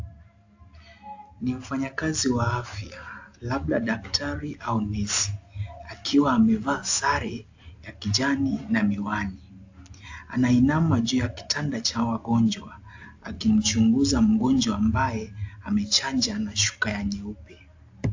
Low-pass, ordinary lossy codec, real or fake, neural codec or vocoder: 7.2 kHz; AAC, 48 kbps; real; none